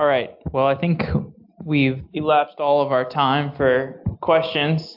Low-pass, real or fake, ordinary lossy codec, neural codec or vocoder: 5.4 kHz; real; AAC, 48 kbps; none